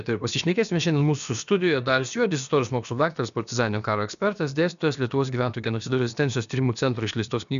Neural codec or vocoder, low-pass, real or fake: codec, 16 kHz, about 1 kbps, DyCAST, with the encoder's durations; 7.2 kHz; fake